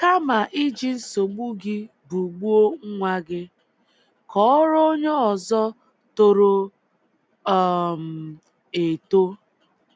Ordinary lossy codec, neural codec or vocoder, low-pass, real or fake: none; none; none; real